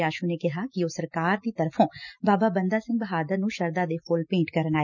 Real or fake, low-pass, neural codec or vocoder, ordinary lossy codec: real; 7.2 kHz; none; none